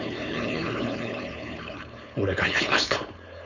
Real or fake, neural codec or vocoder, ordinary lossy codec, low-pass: fake; codec, 16 kHz, 4.8 kbps, FACodec; none; 7.2 kHz